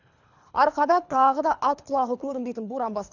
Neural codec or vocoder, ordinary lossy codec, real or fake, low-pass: codec, 24 kHz, 3 kbps, HILCodec; none; fake; 7.2 kHz